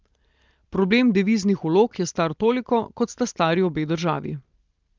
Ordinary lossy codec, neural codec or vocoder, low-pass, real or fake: Opus, 32 kbps; none; 7.2 kHz; real